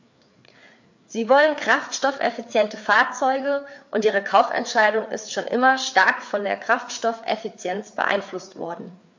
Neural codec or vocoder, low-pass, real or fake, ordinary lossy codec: codec, 16 kHz, 4 kbps, FreqCodec, larger model; 7.2 kHz; fake; MP3, 48 kbps